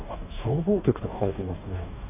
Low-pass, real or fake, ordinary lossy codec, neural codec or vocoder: 3.6 kHz; fake; none; codec, 44.1 kHz, 2.6 kbps, DAC